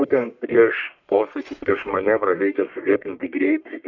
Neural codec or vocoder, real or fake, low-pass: codec, 44.1 kHz, 1.7 kbps, Pupu-Codec; fake; 7.2 kHz